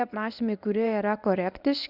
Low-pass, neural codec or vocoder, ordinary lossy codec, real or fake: 5.4 kHz; codec, 24 kHz, 0.9 kbps, WavTokenizer, medium speech release version 1; Opus, 64 kbps; fake